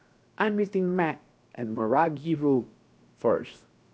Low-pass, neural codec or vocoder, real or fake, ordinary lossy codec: none; codec, 16 kHz, 0.7 kbps, FocalCodec; fake; none